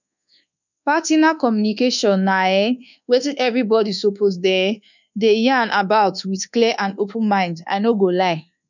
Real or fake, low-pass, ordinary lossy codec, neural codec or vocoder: fake; 7.2 kHz; none; codec, 24 kHz, 1.2 kbps, DualCodec